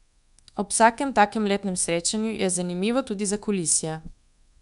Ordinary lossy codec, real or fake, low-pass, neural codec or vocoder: none; fake; 10.8 kHz; codec, 24 kHz, 1.2 kbps, DualCodec